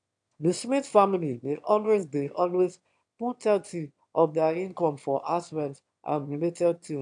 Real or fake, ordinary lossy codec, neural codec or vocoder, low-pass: fake; AAC, 64 kbps; autoencoder, 22.05 kHz, a latent of 192 numbers a frame, VITS, trained on one speaker; 9.9 kHz